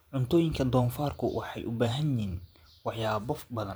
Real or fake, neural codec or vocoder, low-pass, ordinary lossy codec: fake; vocoder, 44.1 kHz, 128 mel bands every 512 samples, BigVGAN v2; none; none